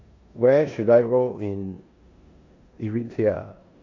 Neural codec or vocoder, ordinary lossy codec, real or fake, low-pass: codec, 16 kHz in and 24 kHz out, 0.9 kbps, LongCat-Audio-Codec, four codebook decoder; AAC, 48 kbps; fake; 7.2 kHz